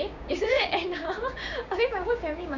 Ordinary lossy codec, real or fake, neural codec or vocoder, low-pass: none; fake; codec, 16 kHz, 6 kbps, DAC; 7.2 kHz